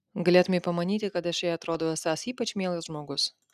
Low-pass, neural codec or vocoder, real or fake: 14.4 kHz; none; real